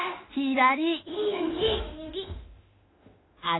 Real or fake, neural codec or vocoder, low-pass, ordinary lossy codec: fake; autoencoder, 48 kHz, 32 numbers a frame, DAC-VAE, trained on Japanese speech; 7.2 kHz; AAC, 16 kbps